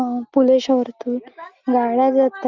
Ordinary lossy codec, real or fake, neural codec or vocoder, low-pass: Opus, 32 kbps; real; none; 7.2 kHz